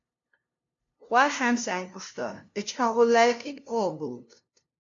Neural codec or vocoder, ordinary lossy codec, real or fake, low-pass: codec, 16 kHz, 0.5 kbps, FunCodec, trained on LibriTTS, 25 frames a second; AAC, 48 kbps; fake; 7.2 kHz